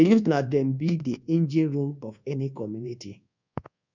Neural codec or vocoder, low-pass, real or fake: codec, 24 kHz, 1.2 kbps, DualCodec; 7.2 kHz; fake